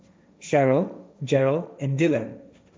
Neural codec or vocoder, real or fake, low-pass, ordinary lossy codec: codec, 16 kHz, 1.1 kbps, Voila-Tokenizer; fake; none; none